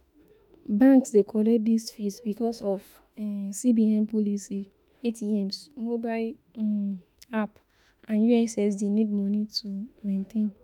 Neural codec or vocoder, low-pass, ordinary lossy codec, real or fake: autoencoder, 48 kHz, 32 numbers a frame, DAC-VAE, trained on Japanese speech; 19.8 kHz; none; fake